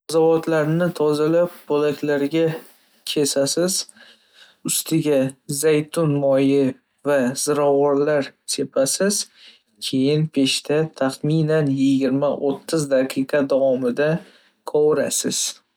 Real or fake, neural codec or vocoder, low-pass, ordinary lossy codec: real; none; none; none